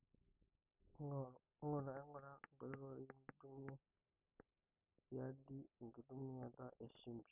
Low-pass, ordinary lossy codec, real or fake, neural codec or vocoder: 3.6 kHz; none; real; none